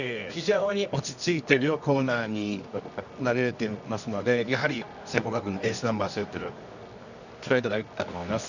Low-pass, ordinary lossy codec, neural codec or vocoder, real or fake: 7.2 kHz; none; codec, 24 kHz, 0.9 kbps, WavTokenizer, medium music audio release; fake